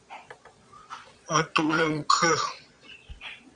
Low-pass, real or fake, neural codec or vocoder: 9.9 kHz; fake; vocoder, 22.05 kHz, 80 mel bands, Vocos